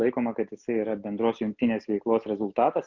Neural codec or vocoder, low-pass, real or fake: none; 7.2 kHz; real